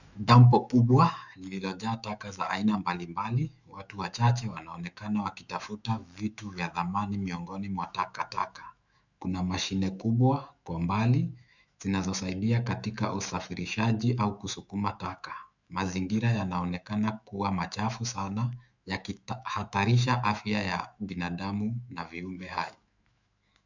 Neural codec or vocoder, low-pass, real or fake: autoencoder, 48 kHz, 128 numbers a frame, DAC-VAE, trained on Japanese speech; 7.2 kHz; fake